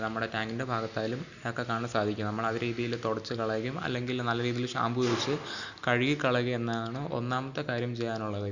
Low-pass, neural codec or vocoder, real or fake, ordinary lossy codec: 7.2 kHz; none; real; none